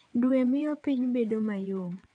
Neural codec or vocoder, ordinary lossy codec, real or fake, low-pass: vocoder, 22.05 kHz, 80 mel bands, WaveNeXt; none; fake; 9.9 kHz